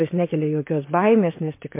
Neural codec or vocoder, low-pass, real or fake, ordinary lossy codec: vocoder, 44.1 kHz, 128 mel bands, Pupu-Vocoder; 3.6 kHz; fake; MP3, 24 kbps